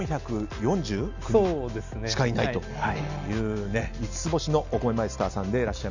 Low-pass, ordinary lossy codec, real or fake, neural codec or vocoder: 7.2 kHz; none; real; none